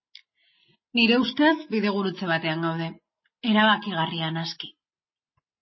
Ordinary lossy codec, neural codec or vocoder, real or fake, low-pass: MP3, 24 kbps; none; real; 7.2 kHz